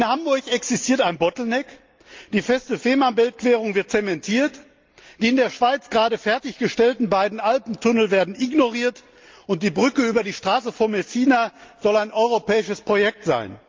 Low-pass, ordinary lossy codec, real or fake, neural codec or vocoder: 7.2 kHz; Opus, 32 kbps; real; none